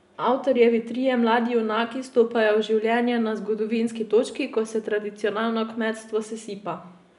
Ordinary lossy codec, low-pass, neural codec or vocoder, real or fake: none; 10.8 kHz; none; real